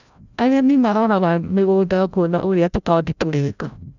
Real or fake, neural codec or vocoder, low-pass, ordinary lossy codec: fake; codec, 16 kHz, 0.5 kbps, FreqCodec, larger model; 7.2 kHz; none